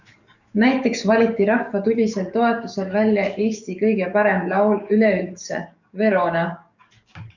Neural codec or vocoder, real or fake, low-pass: codec, 44.1 kHz, 7.8 kbps, DAC; fake; 7.2 kHz